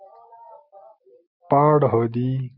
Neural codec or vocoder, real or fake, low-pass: none; real; 5.4 kHz